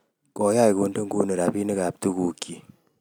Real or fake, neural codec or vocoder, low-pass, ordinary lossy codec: real; none; none; none